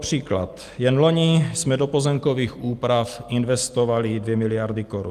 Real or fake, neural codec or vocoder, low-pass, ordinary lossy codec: real; none; 14.4 kHz; Opus, 32 kbps